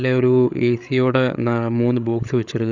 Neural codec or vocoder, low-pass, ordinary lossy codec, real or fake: codec, 16 kHz, 8 kbps, FreqCodec, larger model; 7.2 kHz; none; fake